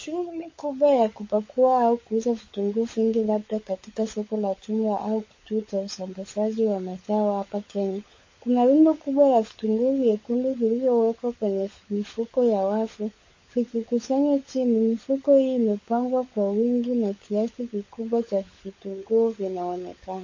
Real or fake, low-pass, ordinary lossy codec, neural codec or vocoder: fake; 7.2 kHz; MP3, 32 kbps; codec, 16 kHz, 16 kbps, FunCodec, trained on LibriTTS, 50 frames a second